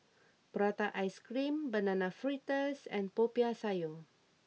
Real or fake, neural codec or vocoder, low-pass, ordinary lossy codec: real; none; none; none